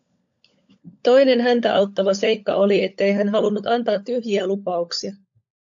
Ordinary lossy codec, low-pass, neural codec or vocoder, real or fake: AAC, 64 kbps; 7.2 kHz; codec, 16 kHz, 16 kbps, FunCodec, trained on LibriTTS, 50 frames a second; fake